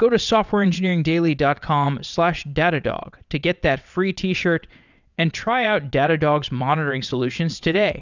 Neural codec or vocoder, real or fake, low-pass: vocoder, 22.05 kHz, 80 mel bands, WaveNeXt; fake; 7.2 kHz